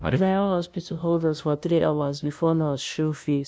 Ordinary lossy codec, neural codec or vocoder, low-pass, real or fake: none; codec, 16 kHz, 0.5 kbps, FunCodec, trained on LibriTTS, 25 frames a second; none; fake